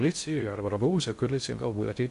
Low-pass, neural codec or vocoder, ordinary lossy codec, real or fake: 10.8 kHz; codec, 16 kHz in and 24 kHz out, 0.6 kbps, FocalCodec, streaming, 2048 codes; MP3, 64 kbps; fake